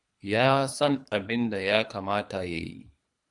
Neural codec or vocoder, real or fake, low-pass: codec, 24 kHz, 3 kbps, HILCodec; fake; 10.8 kHz